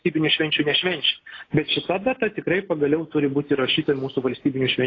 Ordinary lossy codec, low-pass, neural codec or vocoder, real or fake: AAC, 32 kbps; 7.2 kHz; none; real